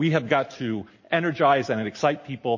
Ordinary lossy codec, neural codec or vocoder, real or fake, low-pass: MP3, 32 kbps; none; real; 7.2 kHz